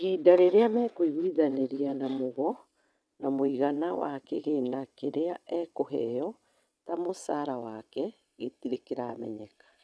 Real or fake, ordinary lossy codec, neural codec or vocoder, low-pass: fake; none; vocoder, 22.05 kHz, 80 mel bands, WaveNeXt; none